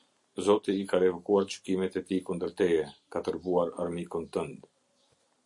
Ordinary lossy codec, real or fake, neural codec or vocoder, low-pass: MP3, 48 kbps; real; none; 10.8 kHz